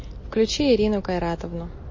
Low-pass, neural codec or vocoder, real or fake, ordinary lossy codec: 7.2 kHz; none; real; MP3, 32 kbps